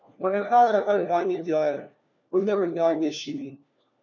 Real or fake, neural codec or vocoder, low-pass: fake; codec, 16 kHz, 1 kbps, FunCodec, trained on LibriTTS, 50 frames a second; 7.2 kHz